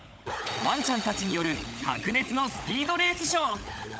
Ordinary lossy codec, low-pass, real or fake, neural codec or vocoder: none; none; fake; codec, 16 kHz, 16 kbps, FunCodec, trained on LibriTTS, 50 frames a second